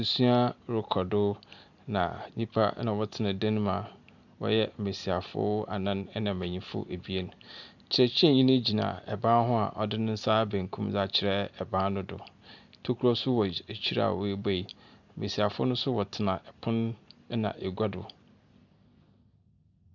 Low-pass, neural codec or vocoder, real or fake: 7.2 kHz; none; real